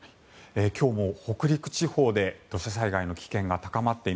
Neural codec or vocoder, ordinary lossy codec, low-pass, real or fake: none; none; none; real